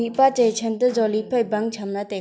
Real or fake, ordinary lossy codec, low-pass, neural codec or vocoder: real; none; none; none